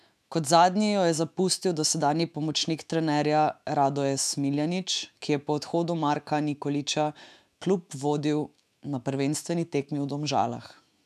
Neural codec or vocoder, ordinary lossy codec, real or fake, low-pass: autoencoder, 48 kHz, 128 numbers a frame, DAC-VAE, trained on Japanese speech; none; fake; 14.4 kHz